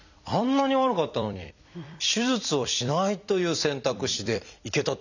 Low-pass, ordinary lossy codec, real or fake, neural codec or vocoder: 7.2 kHz; none; fake; vocoder, 44.1 kHz, 128 mel bands every 256 samples, BigVGAN v2